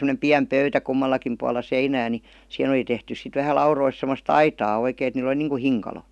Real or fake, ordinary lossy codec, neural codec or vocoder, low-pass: real; none; none; none